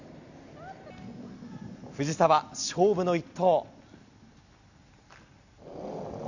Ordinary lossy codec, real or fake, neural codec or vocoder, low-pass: none; real; none; 7.2 kHz